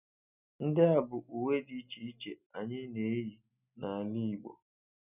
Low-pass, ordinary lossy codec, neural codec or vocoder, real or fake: 3.6 kHz; none; none; real